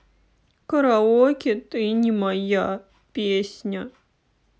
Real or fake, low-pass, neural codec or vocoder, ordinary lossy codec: real; none; none; none